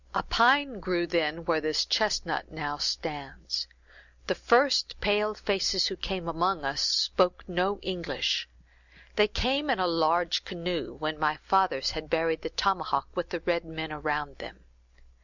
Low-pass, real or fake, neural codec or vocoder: 7.2 kHz; real; none